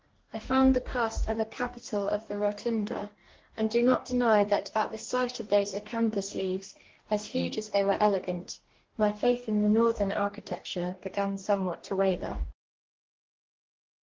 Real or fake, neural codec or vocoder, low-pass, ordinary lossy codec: fake; codec, 44.1 kHz, 2.6 kbps, DAC; 7.2 kHz; Opus, 16 kbps